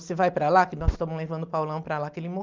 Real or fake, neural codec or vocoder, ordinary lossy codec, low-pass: real; none; Opus, 24 kbps; 7.2 kHz